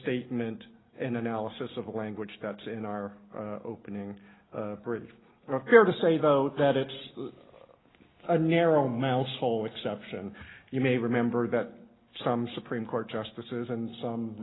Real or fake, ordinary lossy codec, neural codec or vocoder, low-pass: real; AAC, 16 kbps; none; 7.2 kHz